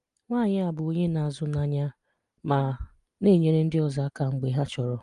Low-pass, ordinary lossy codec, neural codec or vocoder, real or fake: 10.8 kHz; Opus, 32 kbps; none; real